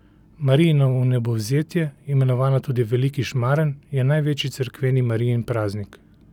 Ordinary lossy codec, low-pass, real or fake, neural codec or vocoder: none; 19.8 kHz; real; none